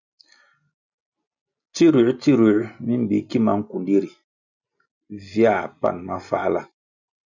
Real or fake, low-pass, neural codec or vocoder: real; 7.2 kHz; none